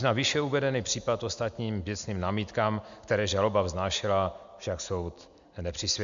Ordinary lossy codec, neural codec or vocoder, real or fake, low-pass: MP3, 64 kbps; none; real; 7.2 kHz